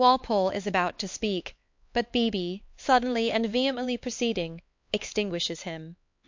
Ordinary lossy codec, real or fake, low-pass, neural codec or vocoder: MP3, 48 kbps; fake; 7.2 kHz; codec, 16 kHz, 4 kbps, X-Codec, HuBERT features, trained on LibriSpeech